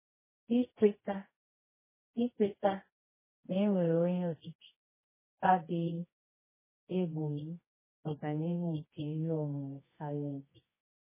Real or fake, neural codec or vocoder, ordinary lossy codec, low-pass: fake; codec, 24 kHz, 0.9 kbps, WavTokenizer, medium music audio release; MP3, 16 kbps; 3.6 kHz